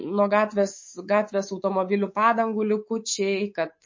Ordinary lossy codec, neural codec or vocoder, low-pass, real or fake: MP3, 32 kbps; codec, 24 kHz, 3.1 kbps, DualCodec; 7.2 kHz; fake